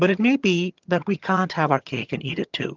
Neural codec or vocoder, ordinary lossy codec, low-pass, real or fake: vocoder, 22.05 kHz, 80 mel bands, HiFi-GAN; Opus, 32 kbps; 7.2 kHz; fake